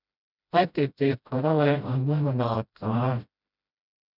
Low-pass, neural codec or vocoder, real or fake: 5.4 kHz; codec, 16 kHz, 0.5 kbps, FreqCodec, smaller model; fake